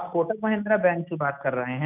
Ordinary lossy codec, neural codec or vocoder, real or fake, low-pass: none; none; real; 3.6 kHz